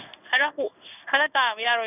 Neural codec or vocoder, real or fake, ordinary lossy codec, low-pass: codec, 16 kHz in and 24 kHz out, 1 kbps, XY-Tokenizer; fake; none; 3.6 kHz